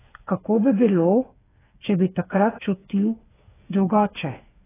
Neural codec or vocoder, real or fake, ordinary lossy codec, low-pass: codec, 16 kHz, 8 kbps, FreqCodec, smaller model; fake; AAC, 16 kbps; 3.6 kHz